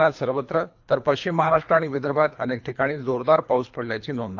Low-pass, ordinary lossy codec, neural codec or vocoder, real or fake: 7.2 kHz; AAC, 48 kbps; codec, 24 kHz, 3 kbps, HILCodec; fake